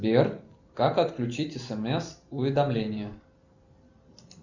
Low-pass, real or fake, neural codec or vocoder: 7.2 kHz; real; none